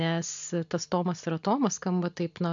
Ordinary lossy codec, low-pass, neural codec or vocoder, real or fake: AAC, 64 kbps; 7.2 kHz; none; real